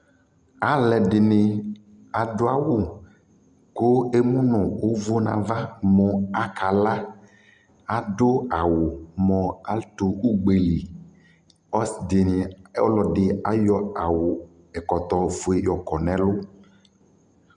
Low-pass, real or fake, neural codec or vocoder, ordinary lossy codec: 9.9 kHz; real; none; Opus, 64 kbps